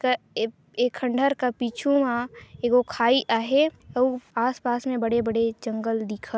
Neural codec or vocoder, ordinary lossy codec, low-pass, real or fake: none; none; none; real